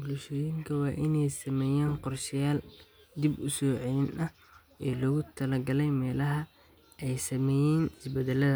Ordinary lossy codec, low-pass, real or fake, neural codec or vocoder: none; none; real; none